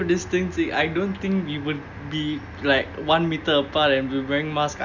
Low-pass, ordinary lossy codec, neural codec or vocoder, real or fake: 7.2 kHz; none; none; real